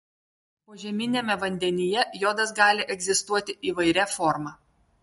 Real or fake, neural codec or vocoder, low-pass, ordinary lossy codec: real; none; 19.8 kHz; MP3, 48 kbps